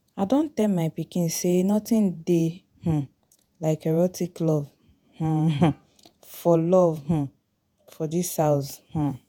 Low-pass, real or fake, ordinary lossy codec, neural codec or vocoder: none; fake; none; vocoder, 48 kHz, 128 mel bands, Vocos